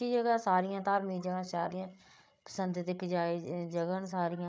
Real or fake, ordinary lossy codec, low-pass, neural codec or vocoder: fake; none; none; codec, 16 kHz, 8 kbps, FreqCodec, larger model